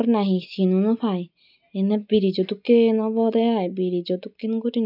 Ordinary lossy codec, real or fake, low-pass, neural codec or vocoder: none; real; 5.4 kHz; none